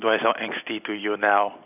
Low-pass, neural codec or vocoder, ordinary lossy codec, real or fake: 3.6 kHz; none; none; real